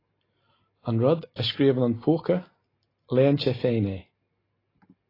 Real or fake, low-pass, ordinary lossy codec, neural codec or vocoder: real; 5.4 kHz; AAC, 24 kbps; none